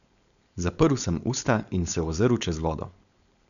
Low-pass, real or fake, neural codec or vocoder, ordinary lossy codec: 7.2 kHz; fake; codec, 16 kHz, 4.8 kbps, FACodec; none